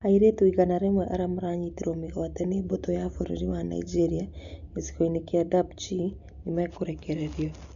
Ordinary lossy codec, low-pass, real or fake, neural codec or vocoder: none; 7.2 kHz; real; none